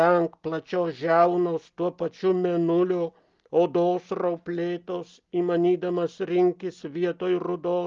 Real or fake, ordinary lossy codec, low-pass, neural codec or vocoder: real; Opus, 32 kbps; 7.2 kHz; none